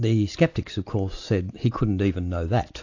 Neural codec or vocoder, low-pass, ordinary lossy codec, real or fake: none; 7.2 kHz; AAC, 48 kbps; real